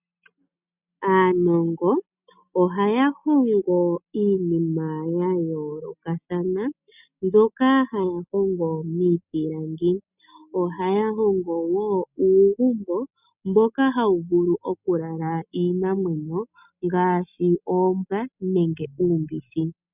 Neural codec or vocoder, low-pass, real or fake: none; 3.6 kHz; real